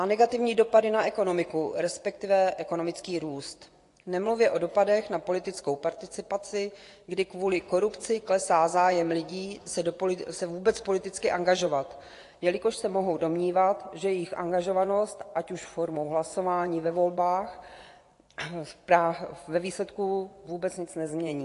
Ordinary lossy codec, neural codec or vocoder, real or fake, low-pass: AAC, 48 kbps; vocoder, 24 kHz, 100 mel bands, Vocos; fake; 10.8 kHz